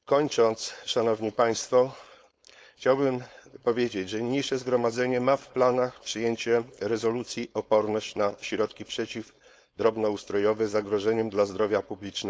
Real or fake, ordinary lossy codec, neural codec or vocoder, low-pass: fake; none; codec, 16 kHz, 4.8 kbps, FACodec; none